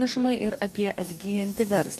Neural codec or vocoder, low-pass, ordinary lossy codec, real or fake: codec, 44.1 kHz, 2.6 kbps, DAC; 14.4 kHz; MP3, 96 kbps; fake